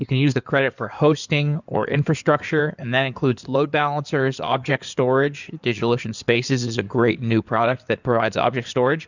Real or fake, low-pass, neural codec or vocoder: fake; 7.2 kHz; codec, 16 kHz in and 24 kHz out, 2.2 kbps, FireRedTTS-2 codec